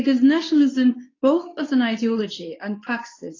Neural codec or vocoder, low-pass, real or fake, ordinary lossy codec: codec, 24 kHz, 0.9 kbps, WavTokenizer, medium speech release version 2; 7.2 kHz; fake; AAC, 32 kbps